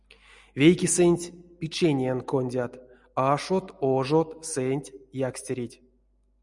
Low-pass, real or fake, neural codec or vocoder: 10.8 kHz; real; none